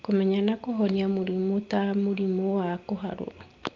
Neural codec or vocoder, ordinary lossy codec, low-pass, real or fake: none; Opus, 32 kbps; 7.2 kHz; real